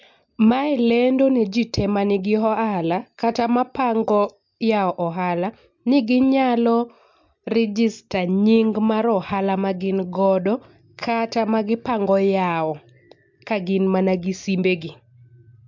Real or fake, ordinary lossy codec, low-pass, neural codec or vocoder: real; MP3, 64 kbps; 7.2 kHz; none